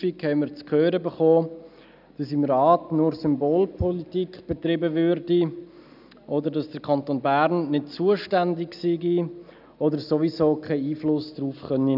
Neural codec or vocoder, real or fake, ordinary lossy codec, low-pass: none; real; none; 5.4 kHz